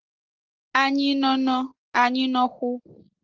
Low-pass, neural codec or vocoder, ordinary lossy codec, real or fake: 7.2 kHz; none; Opus, 16 kbps; real